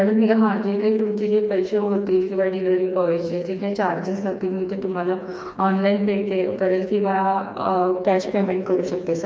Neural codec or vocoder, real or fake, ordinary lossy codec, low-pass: codec, 16 kHz, 2 kbps, FreqCodec, smaller model; fake; none; none